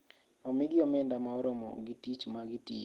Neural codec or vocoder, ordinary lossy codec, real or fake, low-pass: none; Opus, 16 kbps; real; 19.8 kHz